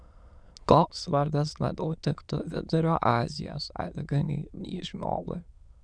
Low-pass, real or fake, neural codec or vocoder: 9.9 kHz; fake; autoencoder, 22.05 kHz, a latent of 192 numbers a frame, VITS, trained on many speakers